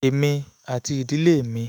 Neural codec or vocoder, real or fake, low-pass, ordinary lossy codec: autoencoder, 48 kHz, 128 numbers a frame, DAC-VAE, trained on Japanese speech; fake; none; none